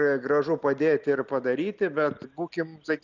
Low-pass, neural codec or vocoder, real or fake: 7.2 kHz; none; real